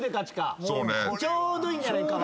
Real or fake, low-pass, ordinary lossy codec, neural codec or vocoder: real; none; none; none